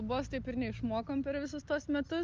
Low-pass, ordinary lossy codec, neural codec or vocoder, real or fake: 7.2 kHz; Opus, 24 kbps; none; real